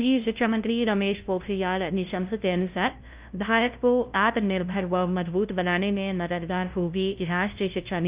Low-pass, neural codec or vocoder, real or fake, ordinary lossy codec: 3.6 kHz; codec, 16 kHz, 0.5 kbps, FunCodec, trained on LibriTTS, 25 frames a second; fake; Opus, 32 kbps